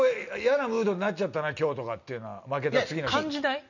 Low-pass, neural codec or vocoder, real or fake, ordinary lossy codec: 7.2 kHz; none; real; none